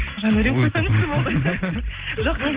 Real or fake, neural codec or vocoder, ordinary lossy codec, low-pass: real; none; Opus, 16 kbps; 3.6 kHz